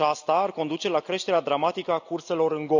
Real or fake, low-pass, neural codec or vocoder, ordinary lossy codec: real; 7.2 kHz; none; none